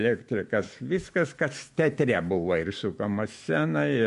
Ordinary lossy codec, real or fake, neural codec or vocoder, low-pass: MP3, 48 kbps; fake; autoencoder, 48 kHz, 128 numbers a frame, DAC-VAE, trained on Japanese speech; 14.4 kHz